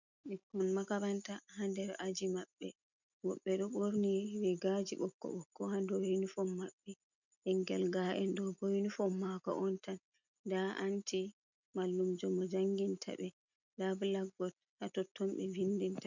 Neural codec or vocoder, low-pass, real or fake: none; 7.2 kHz; real